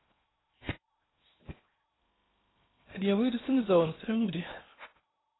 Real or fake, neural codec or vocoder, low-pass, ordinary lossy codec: fake; codec, 16 kHz in and 24 kHz out, 0.8 kbps, FocalCodec, streaming, 65536 codes; 7.2 kHz; AAC, 16 kbps